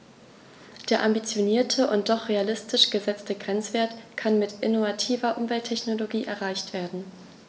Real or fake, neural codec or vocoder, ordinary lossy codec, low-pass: real; none; none; none